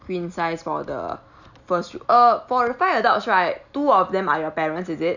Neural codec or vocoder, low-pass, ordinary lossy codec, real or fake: none; 7.2 kHz; none; real